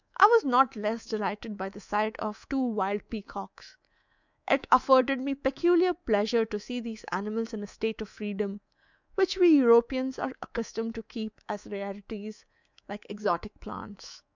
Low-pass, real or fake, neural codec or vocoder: 7.2 kHz; fake; codec, 24 kHz, 3.1 kbps, DualCodec